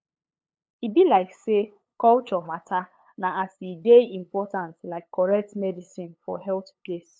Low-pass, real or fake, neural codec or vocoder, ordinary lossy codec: none; fake; codec, 16 kHz, 8 kbps, FunCodec, trained on LibriTTS, 25 frames a second; none